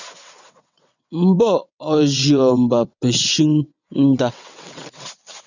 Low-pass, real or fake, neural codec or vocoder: 7.2 kHz; fake; vocoder, 22.05 kHz, 80 mel bands, WaveNeXt